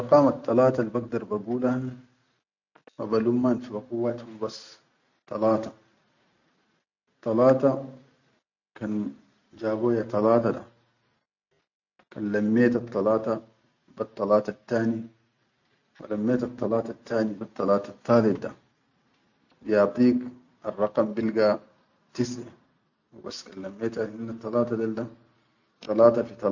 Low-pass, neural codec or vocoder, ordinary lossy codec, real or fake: 7.2 kHz; none; none; real